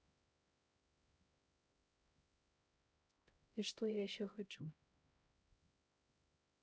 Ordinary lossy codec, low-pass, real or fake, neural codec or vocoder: none; none; fake; codec, 16 kHz, 0.5 kbps, X-Codec, HuBERT features, trained on LibriSpeech